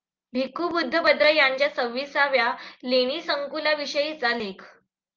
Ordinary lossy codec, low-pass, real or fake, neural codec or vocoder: Opus, 32 kbps; 7.2 kHz; real; none